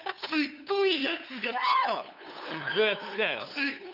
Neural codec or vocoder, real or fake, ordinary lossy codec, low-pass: codec, 16 kHz, 2 kbps, FunCodec, trained on LibriTTS, 25 frames a second; fake; none; 5.4 kHz